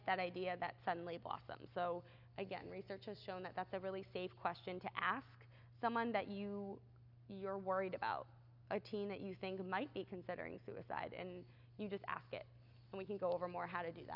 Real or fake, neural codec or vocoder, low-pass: real; none; 5.4 kHz